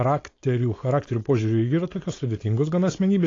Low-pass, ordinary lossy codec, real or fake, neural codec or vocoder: 7.2 kHz; AAC, 32 kbps; fake; codec, 16 kHz, 4.8 kbps, FACodec